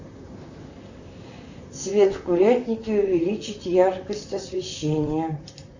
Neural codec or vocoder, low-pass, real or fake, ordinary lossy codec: vocoder, 44.1 kHz, 128 mel bands, Pupu-Vocoder; 7.2 kHz; fake; Opus, 64 kbps